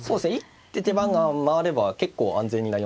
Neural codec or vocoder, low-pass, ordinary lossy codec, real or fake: none; none; none; real